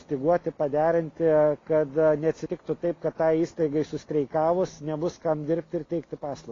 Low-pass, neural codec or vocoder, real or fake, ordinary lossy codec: 7.2 kHz; none; real; AAC, 32 kbps